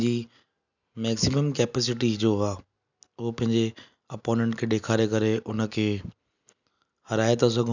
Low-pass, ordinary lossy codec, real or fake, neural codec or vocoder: 7.2 kHz; none; real; none